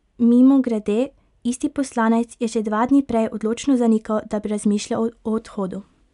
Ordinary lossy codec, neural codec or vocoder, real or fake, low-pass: none; none; real; 10.8 kHz